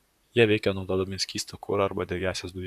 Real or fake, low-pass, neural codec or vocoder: fake; 14.4 kHz; vocoder, 44.1 kHz, 128 mel bands, Pupu-Vocoder